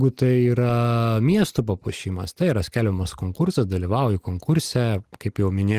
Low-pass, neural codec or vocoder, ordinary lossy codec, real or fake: 14.4 kHz; none; Opus, 24 kbps; real